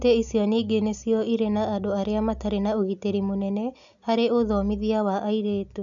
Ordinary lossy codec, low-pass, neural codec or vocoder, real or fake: none; 7.2 kHz; none; real